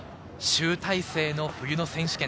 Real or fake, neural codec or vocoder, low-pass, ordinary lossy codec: real; none; none; none